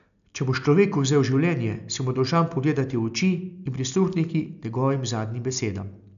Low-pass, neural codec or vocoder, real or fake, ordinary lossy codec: 7.2 kHz; none; real; none